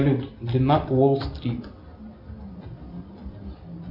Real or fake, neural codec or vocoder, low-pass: real; none; 5.4 kHz